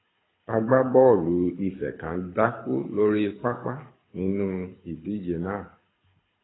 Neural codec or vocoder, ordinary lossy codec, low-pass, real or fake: codec, 24 kHz, 6 kbps, HILCodec; AAC, 16 kbps; 7.2 kHz; fake